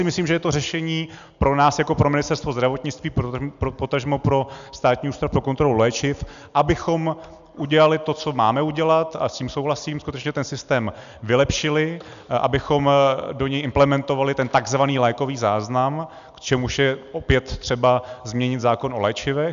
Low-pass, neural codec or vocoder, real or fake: 7.2 kHz; none; real